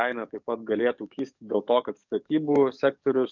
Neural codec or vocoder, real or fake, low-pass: codec, 44.1 kHz, 7.8 kbps, DAC; fake; 7.2 kHz